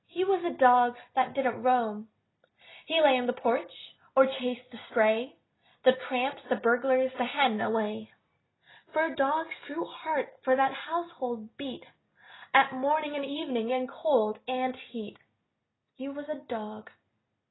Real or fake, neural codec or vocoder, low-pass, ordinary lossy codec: real; none; 7.2 kHz; AAC, 16 kbps